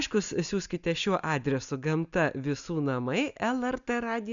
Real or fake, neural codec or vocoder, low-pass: real; none; 7.2 kHz